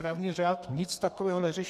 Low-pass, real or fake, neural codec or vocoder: 14.4 kHz; fake; codec, 32 kHz, 1.9 kbps, SNAC